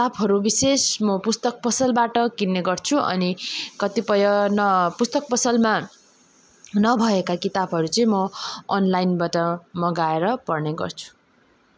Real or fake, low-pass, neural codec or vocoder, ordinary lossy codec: real; none; none; none